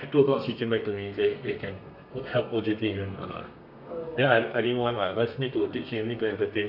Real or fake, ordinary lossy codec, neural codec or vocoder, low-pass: fake; none; codec, 32 kHz, 1.9 kbps, SNAC; 5.4 kHz